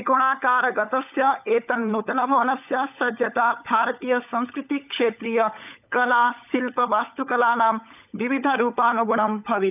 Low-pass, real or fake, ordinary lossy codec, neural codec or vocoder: 3.6 kHz; fake; none; codec, 16 kHz, 16 kbps, FunCodec, trained on LibriTTS, 50 frames a second